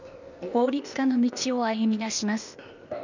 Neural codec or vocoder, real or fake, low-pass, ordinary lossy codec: codec, 16 kHz, 0.8 kbps, ZipCodec; fake; 7.2 kHz; none